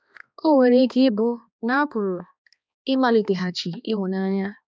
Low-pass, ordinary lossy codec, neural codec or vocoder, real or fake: none; none; codec, 16 kHz, 2 kbps, X-Codec, HuBERT features, trained on balanced general audio; fake